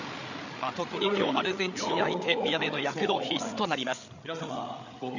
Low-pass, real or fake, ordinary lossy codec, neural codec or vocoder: 7.2 kHz; fake; none; codec, 16 kHz, 8 kbps, FreqCodec, larger model